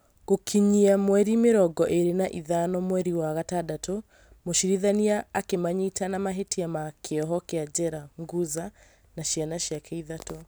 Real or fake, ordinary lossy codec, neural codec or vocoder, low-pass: real; none; none; none